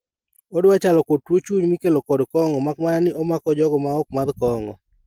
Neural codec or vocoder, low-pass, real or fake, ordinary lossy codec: none; 19.8 kHz; real; Opus, 24 kbps